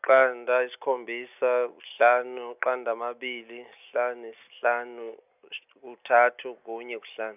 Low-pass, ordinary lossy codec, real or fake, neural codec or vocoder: 3.6 kHz; none; real; none